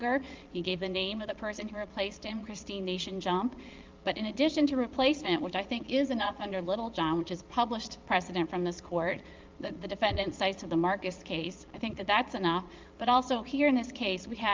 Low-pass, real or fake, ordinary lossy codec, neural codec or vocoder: 7.2 kHz; fake; Opus, 32 kbps; vocoder, 22.05 kHz, 80 mel bands, Vocos